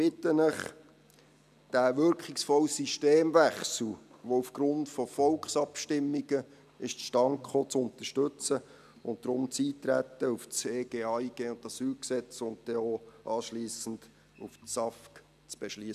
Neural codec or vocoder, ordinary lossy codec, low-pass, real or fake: none; none; 14.4 kHz; real